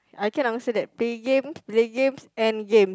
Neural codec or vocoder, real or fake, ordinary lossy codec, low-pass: none; real; none; none